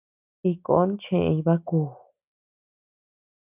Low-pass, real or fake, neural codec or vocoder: 3.6 kHz; real; none